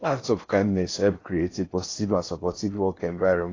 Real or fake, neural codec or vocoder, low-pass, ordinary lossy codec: fake; codec, 16 kHz in and 24 kHz out, 0.6 kbps, FocalCodec, streaming, 4096 codes; 7.2 kHz; AAC, 32 kbps